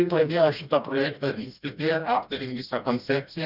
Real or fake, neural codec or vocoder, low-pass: fake; codec, 16 kHz, 1 kbps, FreqCodec, smaller model; 5.4 kHz